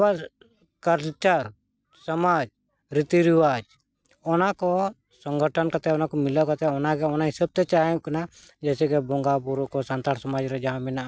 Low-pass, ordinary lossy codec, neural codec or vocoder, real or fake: none; none; none; real